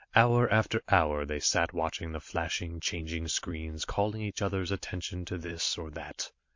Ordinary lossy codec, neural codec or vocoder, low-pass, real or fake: MP3, 48 kbps; vocoder, 44.1 kHz, 128 mel bands every 256 samples, BigVGAN v2; 7.2 kHz; fake